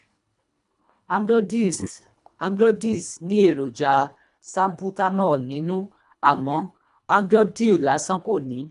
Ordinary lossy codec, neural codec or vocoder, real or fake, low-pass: none; codec, 24 kHz, 1.5 kbps, HILCodec; fake; 10.8 kHz